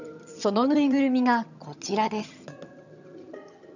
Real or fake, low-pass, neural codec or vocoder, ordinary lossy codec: fake; 7.2 kHz; vocoder, 22.05 kHz, 80 mel bands, HiFi-GAN; none